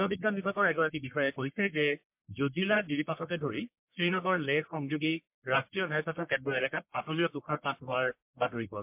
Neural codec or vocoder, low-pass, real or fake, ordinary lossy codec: codec, 44.1 kHz, 1.7 kbps, Pupu-Codec; 3.6 kHz; fake; MP3, 32 kbps